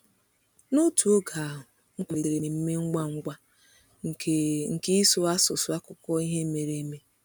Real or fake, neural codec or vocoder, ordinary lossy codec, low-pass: real; none; none; 19.8 kHz